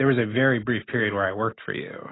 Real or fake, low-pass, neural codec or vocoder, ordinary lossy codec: real; 7.2 kHz; none; AAC, 16 kbps